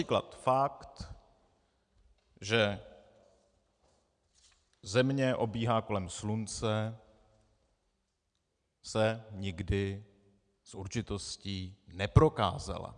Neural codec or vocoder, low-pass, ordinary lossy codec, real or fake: none; 9.9 kHz; MP3, 96 kbps; real